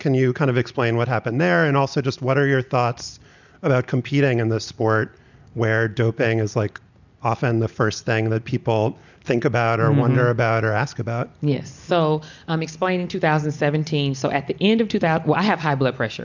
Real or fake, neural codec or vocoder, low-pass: real; none; 7.2 kHz